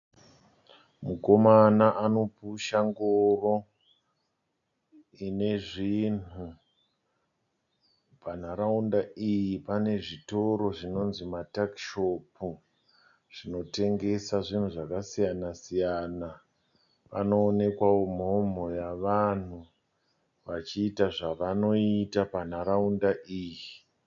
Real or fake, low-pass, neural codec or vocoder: real; 7.2 kHz; none